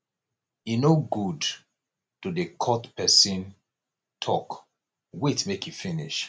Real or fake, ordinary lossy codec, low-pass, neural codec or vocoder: real; none; none; none